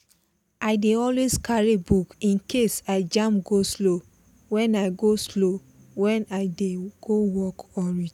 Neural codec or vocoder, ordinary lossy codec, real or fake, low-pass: none; none; real; 19.8 kHz